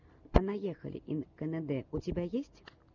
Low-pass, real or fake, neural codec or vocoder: 7.2 kHz; real; none